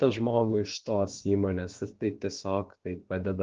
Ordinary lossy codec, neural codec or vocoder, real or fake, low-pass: Opus, 16 kbps; codec, 16 kHz, about 1 kbps, DyCAST, with the encoder's durations; fake; 7.2 kHz